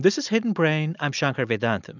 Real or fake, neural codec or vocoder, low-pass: real; none; 7.2 kHz